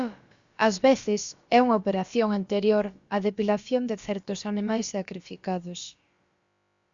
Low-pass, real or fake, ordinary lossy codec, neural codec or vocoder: 7.2 kHz; fake; Opus, 64 kbps; codec, 16 kHz, about 1 kbps, DyCAST, with the encoder's durations